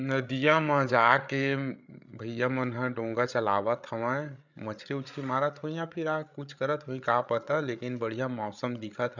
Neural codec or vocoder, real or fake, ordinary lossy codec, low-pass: codec, 16 kHz, 8 kbps, FreqCodec, larger model; fake; none; 7.2 kHz